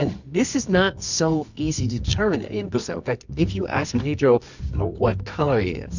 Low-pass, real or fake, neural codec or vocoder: 7.2 kHz; fake; codec, 24 kHz, 0.9 kbps, WavTokenizer, medium music audio release